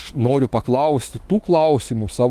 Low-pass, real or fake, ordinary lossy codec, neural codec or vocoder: 14.4 kHz; fake; Opus, 24 kbps; autoencoder, 48 kHz, 32 numbers a frame, DAC-VAE, trained on Japanese speech